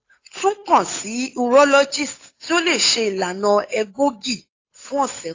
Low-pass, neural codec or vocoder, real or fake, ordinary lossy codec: 7.2 kHz; codec, 16 kHz, 8 kbps, FunCodec, trained on Chinese and English, 25 frames a second; fake; AAC, 32 kbps